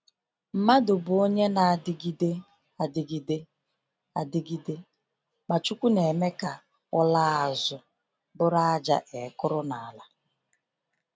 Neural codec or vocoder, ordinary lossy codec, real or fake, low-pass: none; none; real; none